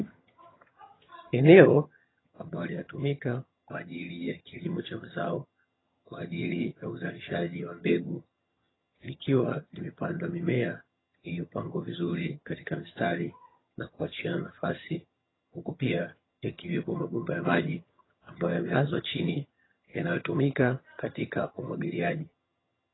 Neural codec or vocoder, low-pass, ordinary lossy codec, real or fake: vocoder, 22.05 kHz, 80 mel bands, HiFi-GAN; 7.2 kHz; AAC, 16 kbps; fake